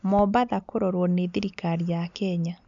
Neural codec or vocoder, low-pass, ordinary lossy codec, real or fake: none; 7.2 kHz; none; real